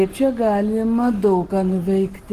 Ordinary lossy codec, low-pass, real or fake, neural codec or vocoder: Opus, 16 kbps; 14.4 kHz; real; none